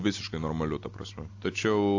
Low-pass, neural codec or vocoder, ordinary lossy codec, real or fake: 7.2 kHz; none; AAC, 48 kbps; real